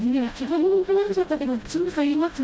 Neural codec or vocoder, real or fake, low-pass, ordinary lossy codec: codec, 16 kHz, 0.5 kbps, FreqCodec, smaller model; fake; none; none